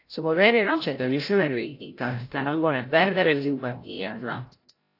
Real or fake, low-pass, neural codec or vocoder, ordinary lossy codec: fake; 5.4 kHz; codec, 16 kHz, 0.5 kbps, FreqCodec, larger model; AAC, 32 kbps